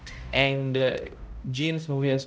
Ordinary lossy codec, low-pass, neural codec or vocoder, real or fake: none; none; codec, 16 kHz, 0.5 kbps, X-Codec, HuBERT features, trained on balanced general audio; fake